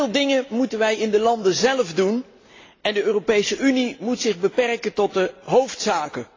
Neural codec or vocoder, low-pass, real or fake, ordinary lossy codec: none; 7.2 kHz; real; AAC, 32 kbps